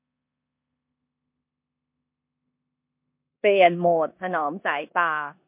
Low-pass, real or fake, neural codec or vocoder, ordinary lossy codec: 3.6 kHz; fake; codec, 16 kHz in and 24 kHz out, 0.9 kbps, LongCat-Audio-Codec, four codebook decoder; MP3, 32 kbps